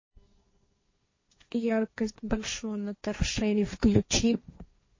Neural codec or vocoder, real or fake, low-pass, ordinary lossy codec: codec, 16 kHz, 1.1 kbps, Voila-Tokenizer; fake; 7.2 kHz; MP3, 32 kbps